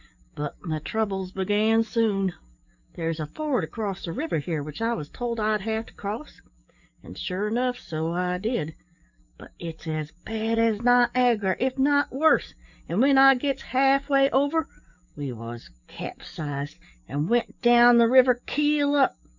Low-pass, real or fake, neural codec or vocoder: 7.2 kHz; fake; codec, 44.1 kHz, 7.8 kbps, DAC